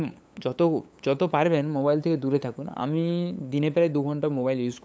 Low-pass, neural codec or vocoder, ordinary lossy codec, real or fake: none; codec, 16 kHz, 8 kbps, FunCodec, trained on LibriTTS, 25 frames a second; none; fake